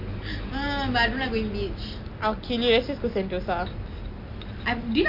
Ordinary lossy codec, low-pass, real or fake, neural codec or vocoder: MP3, 48 kbps; 5.4 kHz; real; none